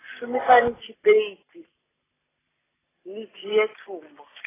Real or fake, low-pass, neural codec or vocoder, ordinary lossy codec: real; 3.6 kHz; none; AAC, 16 kbps